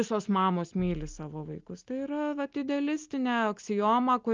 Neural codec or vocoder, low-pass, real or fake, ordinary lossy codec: none; 7.2 kHz; real; Opus, 24 kbps